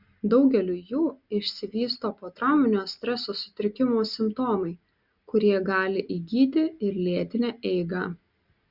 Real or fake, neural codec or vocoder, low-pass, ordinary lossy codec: real; none; 5.4 kHz; Opus, 64 kbps